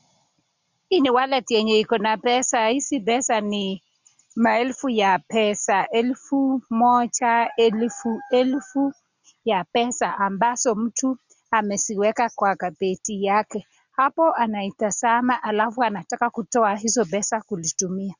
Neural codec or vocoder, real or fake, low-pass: none; real; 7.2 kHz